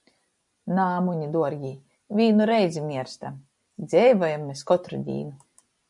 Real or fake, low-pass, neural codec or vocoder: real; 10.8 kHz; none